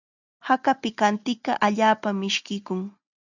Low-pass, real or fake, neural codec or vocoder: 7.2 kHz; real; none